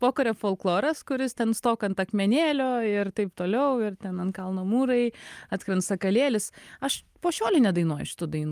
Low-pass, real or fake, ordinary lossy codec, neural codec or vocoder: 14.4 kHz; real; Opus, 32 kbps; none